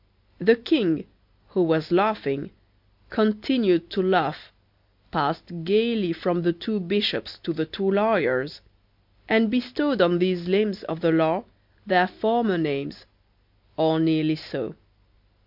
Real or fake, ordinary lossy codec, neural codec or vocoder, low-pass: real; MP3, 48 kbps; none; 5.4 kHz